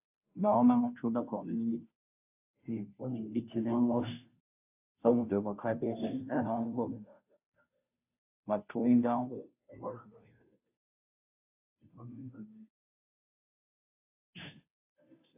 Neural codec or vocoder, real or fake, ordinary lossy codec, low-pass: codec, 16 kHz, 0.5 kbps, FunCodec, trained on Chinese and English, 25 frames a second; fake; AAC, 32 kbps; 3.6 kHz